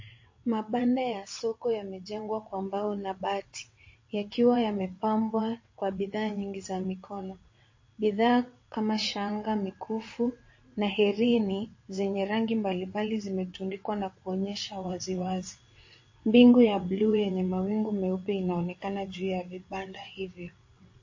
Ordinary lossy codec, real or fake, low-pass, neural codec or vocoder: MP3, 32 kbps; fake; 7.2 kHz; vocoder, 22.05 kHz, 80 mel bands, WaveNeXt